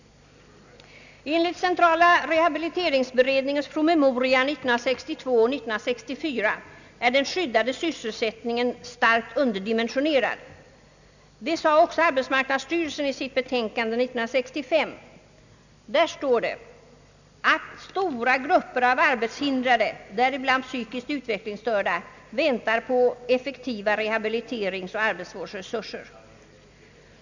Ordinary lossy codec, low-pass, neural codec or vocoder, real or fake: none; 7.2 kHz; none; real